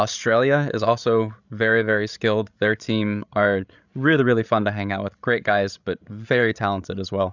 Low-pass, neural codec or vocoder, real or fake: 7.2 kHz; codec, 16 kHz, 16 kbps, FreqCodec, larger model; fake